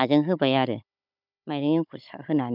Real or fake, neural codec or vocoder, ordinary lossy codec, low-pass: fake; codec, 16 kHz, 16 kbps, FunCodec, trained on Chinese and English, 50 frames a second; none; 5.4 kHz